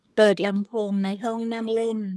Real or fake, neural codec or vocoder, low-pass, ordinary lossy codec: fake; codec, 24 kHz, 1 kbps, SNAC; none; none